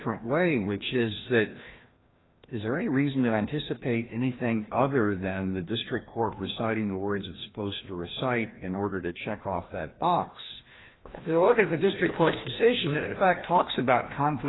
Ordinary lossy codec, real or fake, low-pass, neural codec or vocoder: AAC, 16 kbps; fake; 7.2 kHz; codec, 16 kHz, 1 kbps, FreqCodec, larger model